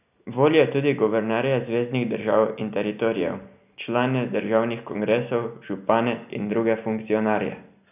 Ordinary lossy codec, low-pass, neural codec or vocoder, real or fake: none; 3.6 kHz; none; real